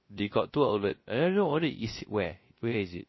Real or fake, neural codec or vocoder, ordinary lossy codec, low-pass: fake; codec, 16 kHz, 0.3 kbps, FocalCodec; MP3, 24 kbps; 7.2 kHz